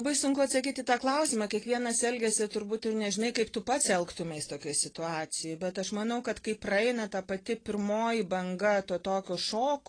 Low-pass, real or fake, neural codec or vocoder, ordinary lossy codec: 9.9 kHz; real; none; AAC, 32 kbps